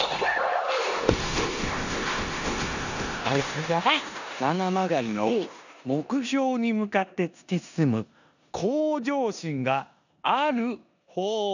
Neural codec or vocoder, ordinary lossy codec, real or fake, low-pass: codec, 16 kHz in and 24 kHz out, 0.9 kbps, LongCat-Audio-Codec, four codebook decoder; none; fake; 7.2 kHz